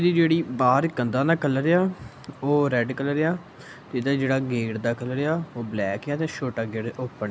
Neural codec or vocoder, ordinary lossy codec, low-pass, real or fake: none; none; none; real